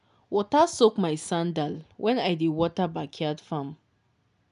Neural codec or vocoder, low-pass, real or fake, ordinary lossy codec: none; 10.8 kHz; real; none